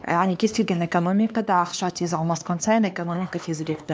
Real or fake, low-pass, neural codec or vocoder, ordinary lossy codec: fake; none; codec, 16 kHz, 2 kbps, X-Codec, HuBERT features, trained on LibriSpeech; none